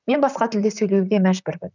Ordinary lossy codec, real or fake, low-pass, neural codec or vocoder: none; fake; 7.2 kHz; vocoder, 44.1 kHz, 128 mel bands, Pupu-Vocoder